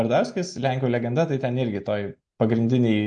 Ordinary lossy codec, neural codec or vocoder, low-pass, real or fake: MP3, 48 kbps; none; 9.9 kHz; real